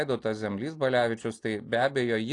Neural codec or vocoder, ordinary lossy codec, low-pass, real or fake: none; AAC, 48 kbps; 10.8 kHz; real